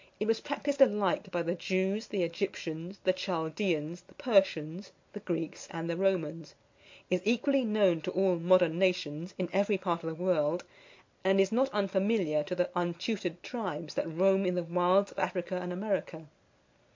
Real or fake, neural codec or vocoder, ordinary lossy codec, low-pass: real; none; MP3, 48 kbps; 7.2 kHz